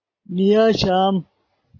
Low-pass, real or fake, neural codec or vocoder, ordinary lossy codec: 7.2 kHz; real; none; AAC, 32 kbps